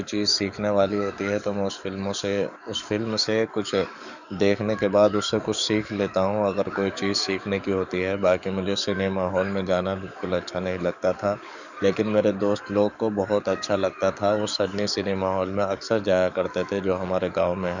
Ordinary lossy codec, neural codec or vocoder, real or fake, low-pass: none; codec, 44.1 kHz, 7.8 kbps, DAC; fake; 7.2 kHz